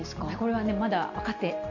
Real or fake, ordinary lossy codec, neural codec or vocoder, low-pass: real; none; none; 7.2 kHz